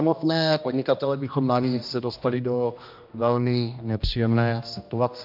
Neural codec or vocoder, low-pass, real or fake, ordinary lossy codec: codec, 16 kHz, 1 kbps, X-Codec, HuBERT features, trained on general audio; 5.4 kHz; fake; MP3, 48 kbps